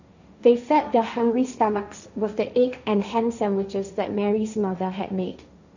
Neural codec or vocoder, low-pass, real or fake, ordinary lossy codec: codec, 16 kHz, 1.1 kbps, Voila-Tokenizer; 7.2 kHz; fake; none